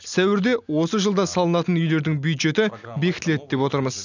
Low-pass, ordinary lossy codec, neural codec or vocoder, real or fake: 7.2 kHz; none; none; real